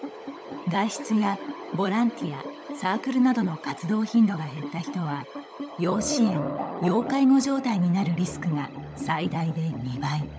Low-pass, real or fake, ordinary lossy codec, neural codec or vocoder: none; fake; none; codec, 16 kHz, 16 kbps, FunCodec, trained on LibriTTS, 50 frames a second